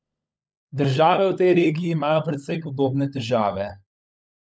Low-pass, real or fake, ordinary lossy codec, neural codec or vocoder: none; fake; none; codec, 16 kHz, 16 kbps, FunCodec, trained on LibriTTS, 50 frames a second